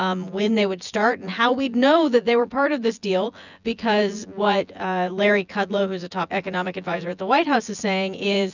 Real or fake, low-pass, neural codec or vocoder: fake; 7.2 kHz; vocoder, 24 kHz, 100 mel bands, Vocos